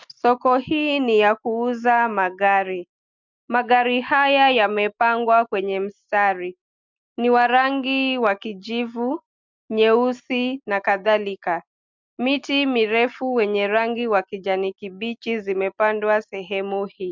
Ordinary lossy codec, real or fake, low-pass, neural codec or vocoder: MP3, 64 kbps; real; 7.2 kHz; none